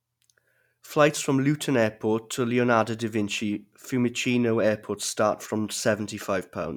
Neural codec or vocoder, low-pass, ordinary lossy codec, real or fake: none; 19.8 kHz; none; real